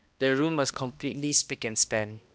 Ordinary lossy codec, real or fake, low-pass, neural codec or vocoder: none; fake; none; codec, 16 kHz, 1 kbps, X-Codec, HuBERT features, trained on balanced general audio